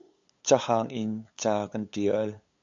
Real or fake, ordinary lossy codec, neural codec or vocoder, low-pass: fake; MP3, 64 kbps; codec, 16 kHz, 8 kbps, FunCodec, trained on LibriTTS, 25 frames a second; 7.2 kHz